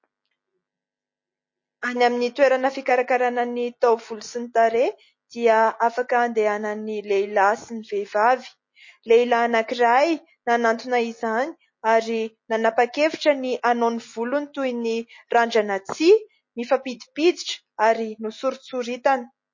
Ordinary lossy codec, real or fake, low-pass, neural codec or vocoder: MP3, 32 kbps; real; 7.2 kHz; none